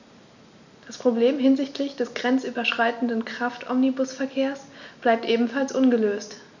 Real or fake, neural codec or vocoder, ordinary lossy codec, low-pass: real; none; none; 7.2 kHz